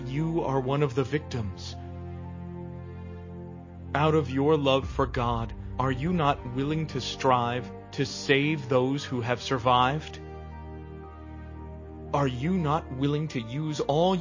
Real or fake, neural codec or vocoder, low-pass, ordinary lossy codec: real; none; 7.2 kHz; MP3, 32 kbps